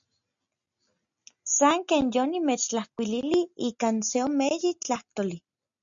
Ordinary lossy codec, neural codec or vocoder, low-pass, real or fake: MP3, 64 kbps; none; 7.2 kHz; real